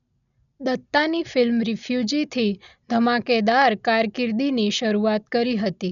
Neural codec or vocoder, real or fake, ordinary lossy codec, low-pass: none; real; none; 7.2 kHz